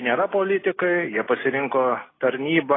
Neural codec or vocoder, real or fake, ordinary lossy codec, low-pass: none; real; AAC, 16 kbps; 7.2 kHz